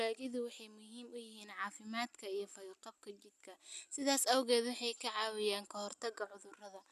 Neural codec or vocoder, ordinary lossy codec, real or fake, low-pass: none; none; real; 14.4 kHz